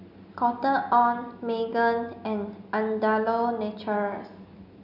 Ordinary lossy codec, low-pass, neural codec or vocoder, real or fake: none; 5.4 kHz; none; real